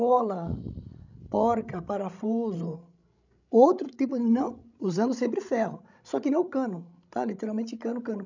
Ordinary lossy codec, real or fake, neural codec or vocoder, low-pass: none; fake; codec, 16 kHz, 16 kbps, FreqCodec, larger model; 7.2 kHz